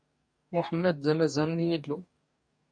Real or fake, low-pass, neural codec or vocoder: fake; 9.9 kHz; codec, 44.1 kHz, 2.6 kbps, DAC